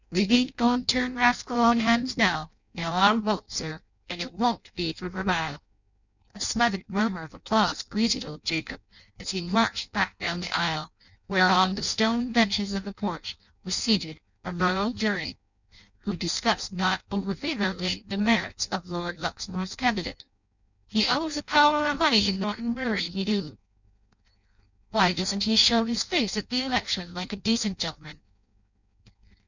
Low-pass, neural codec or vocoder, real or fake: 7.2 kHz; codec, 16 kHz in and 24 kHz out, 0.6 kbps, FireRedTTS-2 codec; fake